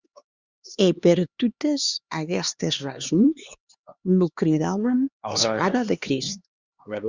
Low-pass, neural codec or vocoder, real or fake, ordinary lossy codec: 7.2 kHz; codec, 16 kHz, 2 kbps, X-Codec, HuBERT features, trained on LibriSpeech; fake; Opus, 64 kbps